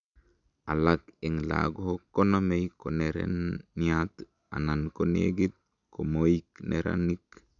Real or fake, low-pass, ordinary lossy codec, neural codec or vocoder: real; 7.2 kHz; none; none